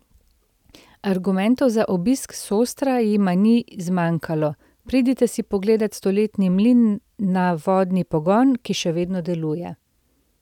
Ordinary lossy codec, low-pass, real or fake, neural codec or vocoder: none; 19.8 kHz; real; none